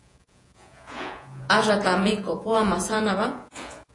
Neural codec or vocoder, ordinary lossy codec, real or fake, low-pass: vocoder, 48 kHz, 128 mel bands, Vocos; AAC, 48 kbps; fake; 10.8 kHz